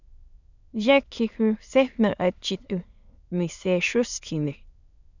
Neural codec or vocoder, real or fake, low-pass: autoencoder, 22.05 kHz, a latent of 192 numbers a frame, VITS, trained on many speakers; fake; 7.2 kHz